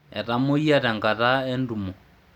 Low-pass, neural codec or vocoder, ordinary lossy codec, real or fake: 19.8 kHz; none; none; real